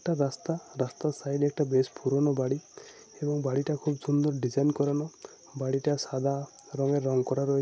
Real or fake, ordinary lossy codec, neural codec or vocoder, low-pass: real; none; none; none